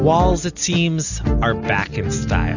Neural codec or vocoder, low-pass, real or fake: none; 7.2 kHz; real